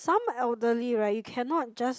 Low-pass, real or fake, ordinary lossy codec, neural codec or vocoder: none; real; none; none